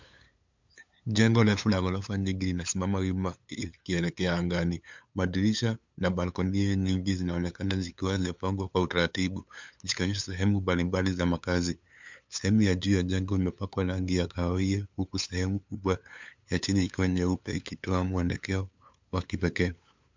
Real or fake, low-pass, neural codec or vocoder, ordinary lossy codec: fake; 7.2 kHz; codec, 16 kHz, 8 kbps, FunCodec, trained on LibriTTS, 25 frames a second; MP3, 64 kbps